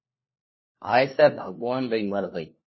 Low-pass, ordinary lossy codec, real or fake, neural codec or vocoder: 7.2 kHz; MP3, 24 kbps; fake; codec, 16 kHz, 1 kbps, FunCodec, trained on LibriTTS, 50 frames a second